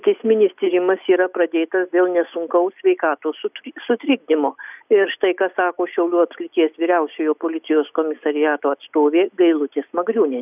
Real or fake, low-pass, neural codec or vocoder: real; 3.6 kHz; none